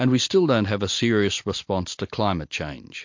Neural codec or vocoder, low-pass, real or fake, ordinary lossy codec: none; 7.2 kHz; real; MP3, 48 kbps